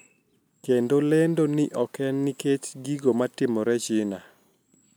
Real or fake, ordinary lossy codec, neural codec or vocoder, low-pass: real; none; none; none